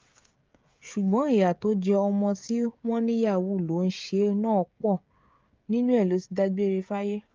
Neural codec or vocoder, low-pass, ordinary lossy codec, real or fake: codec, 16 kHz, 16 kbps, FreqCodec, smaller model; 7.2 kHz; Opus, 24 kbps; fake